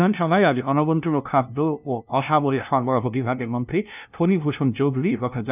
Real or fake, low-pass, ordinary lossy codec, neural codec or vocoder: fake; 3.6 kHz; none; codec, 16 kHz, 0.5 kbps, FunCodec, trained on LibriTTS, 25 frames a second